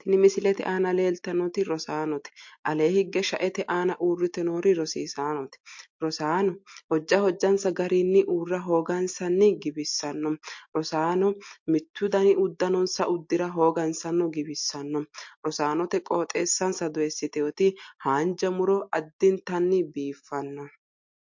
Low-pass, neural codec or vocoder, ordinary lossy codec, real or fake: 7.2 kHz; none; MP3, 48 kbps; real